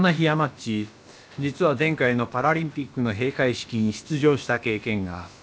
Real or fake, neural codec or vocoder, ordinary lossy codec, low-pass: fake; codec, 16 kHz, about 1 kbps, DyCAST, with the encoder's durations; none; none